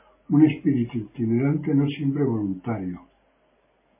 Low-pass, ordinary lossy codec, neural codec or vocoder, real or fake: 3.6 kHz; MP3, 16 kbps; none; real